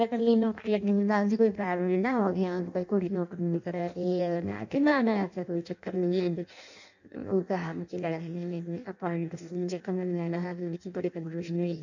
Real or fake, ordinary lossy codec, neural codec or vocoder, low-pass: fake; none; codec, 16 kHz in and 24 kHz out, 0.6 kbps, FireRedTTS-2 codec; 7.2 kHz